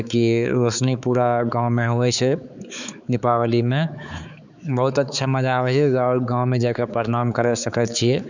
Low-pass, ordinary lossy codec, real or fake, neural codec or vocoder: 7.2 kHz; none; fake; codec, 16 kHz, 4 kbps, X-Codec, HuBERT features, trained on balanced general audio